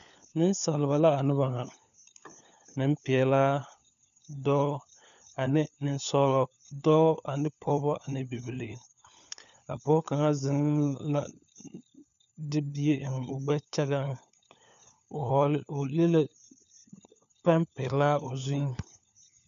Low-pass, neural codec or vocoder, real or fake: 7.2 kHz; codec, 16 kHz, 4 kbps, FunCodec, trained on LibriTTS, 50 frames a second; fake